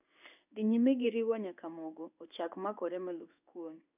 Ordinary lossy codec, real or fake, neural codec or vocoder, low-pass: none; fake; codec, 16 kHz in and 24 kHz out, 1 kbps, XY-Tokenizer; 3.6 kHz